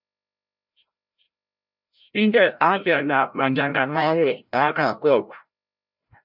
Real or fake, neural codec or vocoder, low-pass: fake; codec, 16 kHz, 0.5 kbps, FreqCodec, larger model; 5.4 kHz